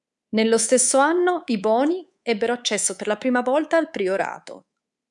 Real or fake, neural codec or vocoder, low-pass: fake; codec, 24 kHz, 3.1 kbps, DualCodec; 10.8 kHz